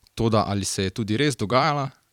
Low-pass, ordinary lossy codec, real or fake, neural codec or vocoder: 19.8 kHz; none; fake; vocoder, 44.1 kHz, 128 mel bands every 256 samples, BigVGAN v2